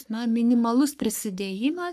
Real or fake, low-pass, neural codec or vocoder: fake; 14.4 kHz; codec, 44.1 kHz, 3.4 kbps, Pupu-Codec